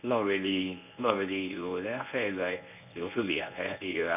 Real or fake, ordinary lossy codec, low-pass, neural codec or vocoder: fake; none; 3.6 kHz; codec, 24 kHz, 0.9 kbps, WavTokenizer, medium speech release version 1